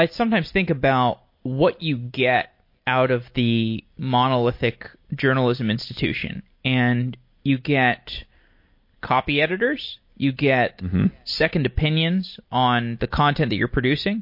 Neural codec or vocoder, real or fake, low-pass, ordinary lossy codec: none; real; 5.4 kHz; MP3, 32 kbps